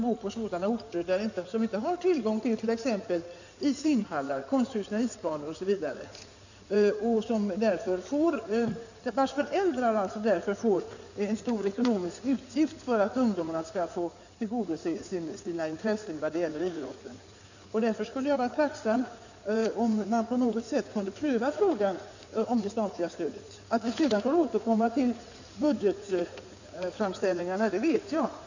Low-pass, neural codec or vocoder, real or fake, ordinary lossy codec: 7.2 kHz; codec, 16 kHz in and 24 kHz out, 2.2 kbps, FireRedTTS-2 codec; fake; none